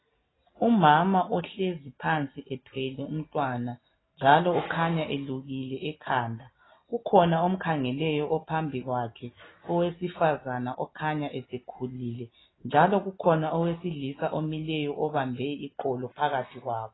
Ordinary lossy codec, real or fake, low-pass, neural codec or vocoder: AAC, 16 kbps; real; 7.2 kHz; none